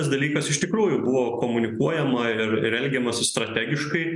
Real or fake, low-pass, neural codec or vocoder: real; 10.8 kHz; none